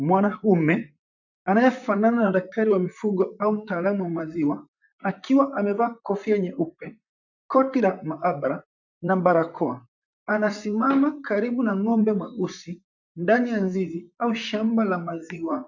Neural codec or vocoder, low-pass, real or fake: vocoder, 44.1 kHz, 128 mel bands, Pupu-Vocoder; 7.2 kHz; fake